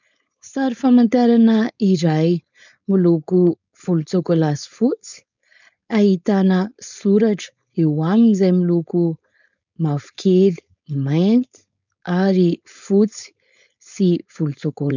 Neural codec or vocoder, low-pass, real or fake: codec, 16 kHz, 4.8 kbps, FACodec; 7.2 kHz; fake